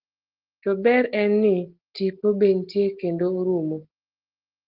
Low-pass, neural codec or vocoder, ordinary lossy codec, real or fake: 5.4 kHz; none; Opus, 16 kbps; real